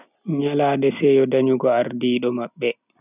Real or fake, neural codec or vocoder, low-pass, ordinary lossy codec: real; none; 3.6 kHz; none